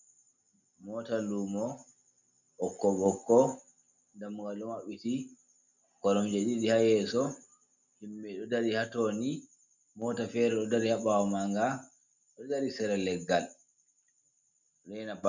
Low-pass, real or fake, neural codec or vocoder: 7.2 kHz; real; none